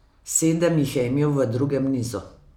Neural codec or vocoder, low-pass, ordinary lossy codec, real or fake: none; 19.8 kHz; none; real